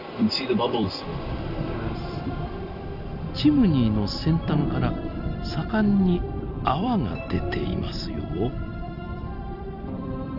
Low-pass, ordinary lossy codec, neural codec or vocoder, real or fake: 5.4 kHz; none; vocoder, 44.1 kHz, 128 mel bands every 256 samples, BigVGAN v2; fake